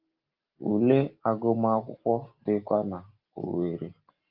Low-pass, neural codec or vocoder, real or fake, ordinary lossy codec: 5.4 kHz; none; real; Opus, 32 kbps